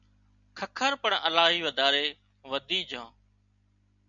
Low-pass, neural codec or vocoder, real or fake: 7.2 kHz; none; real